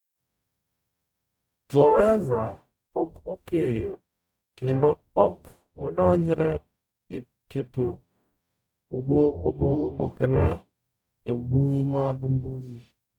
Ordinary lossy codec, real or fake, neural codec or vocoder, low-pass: MP3, 96 kbps; fake; codec, 44.1 kHz, 0.9 kbps, DAC; 19.8 kHz